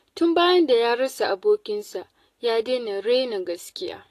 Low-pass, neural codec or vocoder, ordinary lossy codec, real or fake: 14.4 kHz; none; AAC, 48 kbps; real